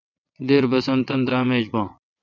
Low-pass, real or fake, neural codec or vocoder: 7.2 kHz; fake; vocoder, 22.05 kHz, 80 mel bands, WaveNeXt